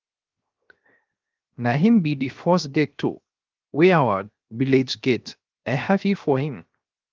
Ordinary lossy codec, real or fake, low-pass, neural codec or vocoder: Opus, 32 kbps; fake; 7.2 kHz; codec, 16 kHz, 0.7 kbps, FocalCodec